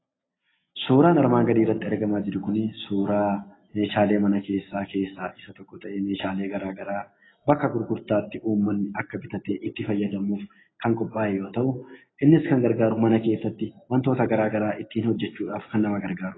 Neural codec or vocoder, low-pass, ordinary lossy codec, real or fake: none; 7.2 kHz; AAC, 16 kbps; real